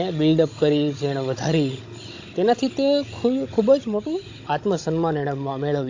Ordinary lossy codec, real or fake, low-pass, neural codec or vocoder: AAC, 48 kbps; fake; 7.2 kHz; codec, 16 kHz, 16 kbps, FunCodec, trained on Chinese and English, 50 frames a second